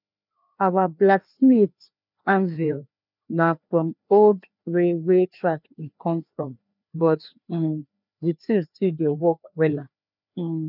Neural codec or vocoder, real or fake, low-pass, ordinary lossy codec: codec, 16 kHz, 2 kbps, FreqCodec, larger model; fake; 5.4 kHz; none